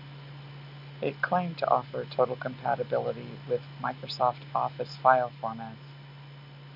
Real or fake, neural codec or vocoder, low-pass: real; none; 5.4 kHz